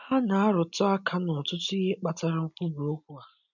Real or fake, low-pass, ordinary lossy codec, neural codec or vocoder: real; 7.2 kHz; none; none